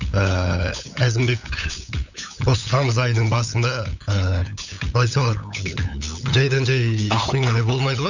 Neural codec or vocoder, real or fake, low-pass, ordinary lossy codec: codec, 16 kHz, 8 kbps, FunCodec, trained on LibriTTS, 25 frames a second; fake; 7.2 kHz; none